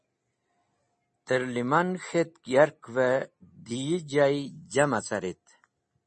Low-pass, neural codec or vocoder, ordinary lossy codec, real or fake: 10.8 kHz; none; MP3, 32 kbps; real